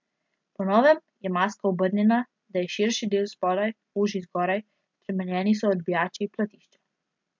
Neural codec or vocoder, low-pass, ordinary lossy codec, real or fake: none; 7.2 kHz; MP3, 64 kbps; real